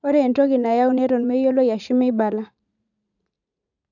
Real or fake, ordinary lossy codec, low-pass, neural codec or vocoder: fake; none; 7.2 kHz; vocoder, 44.1 kHz, 80 mel bands, Vocos